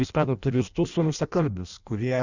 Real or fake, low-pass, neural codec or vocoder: fake; 7.2 kHz; codec, 16 kHz in and 24 kHz out, 0.6 kbps, FireRedTTS-2 codec